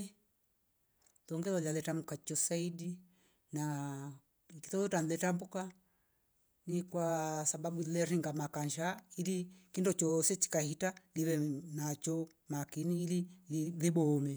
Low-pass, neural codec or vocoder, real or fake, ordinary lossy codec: none; vocoder, 48 kHz, 128 mel bands, Vocos; fake; none